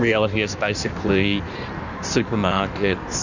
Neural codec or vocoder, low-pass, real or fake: codec, 16 kHz in and 24 kHz out, 1.1 kbps, FireRedTTS-2 codec; 7.2 kHz; fake